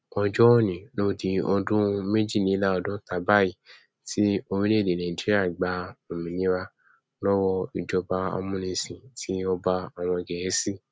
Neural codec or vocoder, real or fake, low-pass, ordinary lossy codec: none; real; none; none